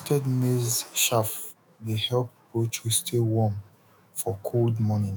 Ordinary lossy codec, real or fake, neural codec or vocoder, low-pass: none; fake; autoencoder, 48 kHz, 128 numbers a frame, DAC-VAE, trained on Japanese speech; none